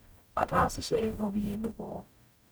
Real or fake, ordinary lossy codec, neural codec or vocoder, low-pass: fake; none; codec, 44.1 kHz, 0.9 kbps, DAC; none